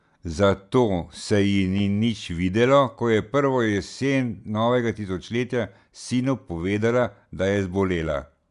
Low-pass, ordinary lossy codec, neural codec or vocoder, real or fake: 10.8 kHz; none; none; real